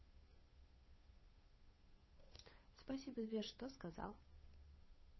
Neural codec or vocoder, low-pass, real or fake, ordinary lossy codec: codec, 16 kHz, 8 kbps, FunCodec, trained on Chinese and English, 25 frames a second; 7.2 kHz; fake; MP3, 24 kbps